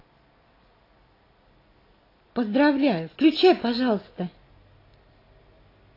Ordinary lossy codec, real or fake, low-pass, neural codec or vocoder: AAC, 24 kbps; real; 5.4 kHz; none